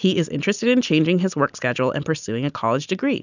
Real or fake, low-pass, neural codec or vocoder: real; 7.2 kHz; none